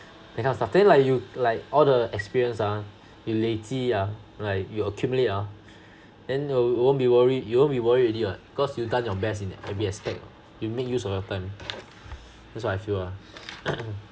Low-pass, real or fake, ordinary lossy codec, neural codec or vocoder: none; real; none; none